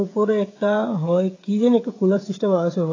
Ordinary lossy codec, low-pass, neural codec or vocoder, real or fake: AAC, 32 kbps; 7.2 kHz; codec, 16 kHz, 16 kbps, FreqCodec, smaller model; fake